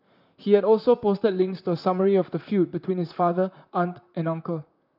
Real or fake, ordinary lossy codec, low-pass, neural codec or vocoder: fake; none; 5.4 kHz; vocoder, 44.1 kHz, 128 mel bands, Pupu-Vocoder